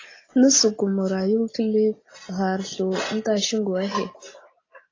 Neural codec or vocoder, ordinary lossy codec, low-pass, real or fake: none; AAC, 32 kbps; 7.2 kHz; real